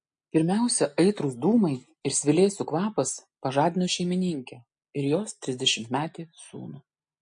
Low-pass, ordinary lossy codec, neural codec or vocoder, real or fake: 9.9 kHz; MP3, 48 kbps; none; real